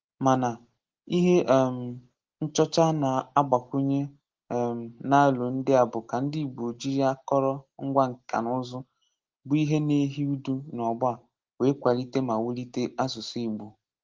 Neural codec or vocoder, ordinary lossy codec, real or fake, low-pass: none; Opus, 16 kbps; real; 7.2 kHz